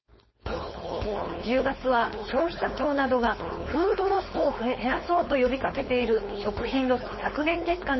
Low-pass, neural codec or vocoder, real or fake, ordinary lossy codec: 7.2 kHz; codec, 16 kHz, 4.8 kbps, FACodec; fake; MP3, 24 kbps